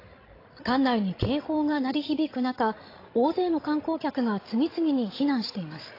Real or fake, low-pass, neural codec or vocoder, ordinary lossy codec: fake; 5.4 kHz; codec, 16 kHz, 16 kbps, FreqCodec, larger model; AAC, 24 kbps